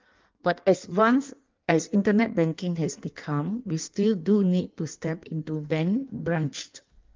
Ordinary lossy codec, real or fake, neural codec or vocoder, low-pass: Opus, 32 kbps; fake; codec, 16 kHz in and 24 kHz out, 1.1 kbps, FireRedTTS-2 codec; 7.2 kHz